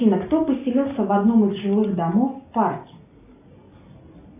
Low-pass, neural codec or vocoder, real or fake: 3.6 kHz; none; real